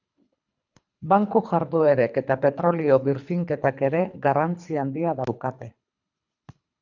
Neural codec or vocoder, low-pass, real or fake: codec, 24 kHz, 3 kbps, HILCodec; 7.2 kHz; fake